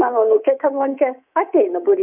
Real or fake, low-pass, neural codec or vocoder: fake; 3.6 kHz; codec, 24 kHz, 3.1 kbps, DualCodec